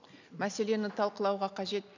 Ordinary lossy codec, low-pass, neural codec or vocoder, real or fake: none; 7.2 kHz; none; real